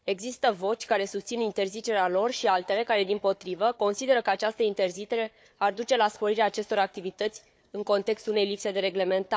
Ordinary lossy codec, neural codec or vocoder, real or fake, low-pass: none; codec, 16 kHz, 4 kbps, FunCodec, trained on Chinese and English, 50 frames a second; fake; none